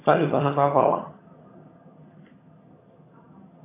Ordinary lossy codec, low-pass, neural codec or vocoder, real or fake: AAC, 16 kbps; 3.6 kHz; vocoder, 22.05 kHz, 80 mel bands, HiFi-GAN; fake